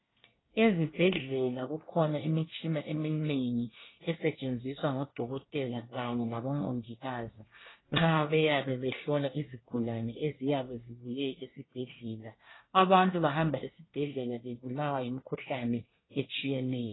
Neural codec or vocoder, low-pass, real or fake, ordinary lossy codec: codec, 24 kHz, 1 kbps, SNAC; 7.2 kHz; fake; AAC, 16 kbps